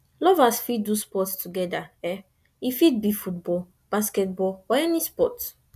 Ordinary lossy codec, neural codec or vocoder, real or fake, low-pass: none; none; real; 14.4 kHz